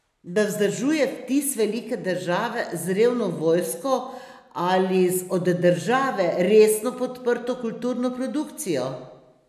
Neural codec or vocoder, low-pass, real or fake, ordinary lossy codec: none; 14.4 kHz; real; none